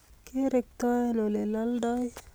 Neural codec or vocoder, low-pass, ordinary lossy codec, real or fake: none; none; none; real